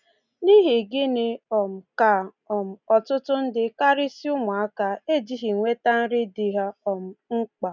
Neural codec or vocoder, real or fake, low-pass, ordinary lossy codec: none; real; 7.2 kHz; none